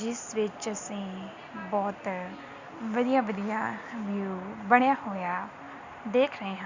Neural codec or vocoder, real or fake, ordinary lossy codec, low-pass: none; real; Opus, 64 kbps; 7.2 kHz